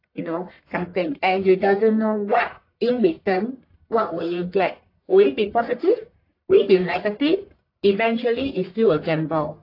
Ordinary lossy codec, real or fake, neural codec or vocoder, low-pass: AAC, 32 kbps; fake; codec, 44.1 kHz, 1.7 kbps, Pupu-Codec; 5.4 kHz